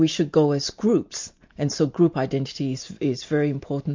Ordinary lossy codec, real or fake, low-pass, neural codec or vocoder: MP3, 48 kbps; real; 7.2 kHz; none